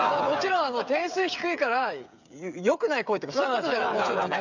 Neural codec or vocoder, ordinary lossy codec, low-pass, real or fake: codec, 16 kHz, 8 kbps, FreqCodec, smaller model; none; 7.2 kHz; fake